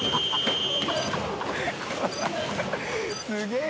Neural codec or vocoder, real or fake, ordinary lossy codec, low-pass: none; real; none; none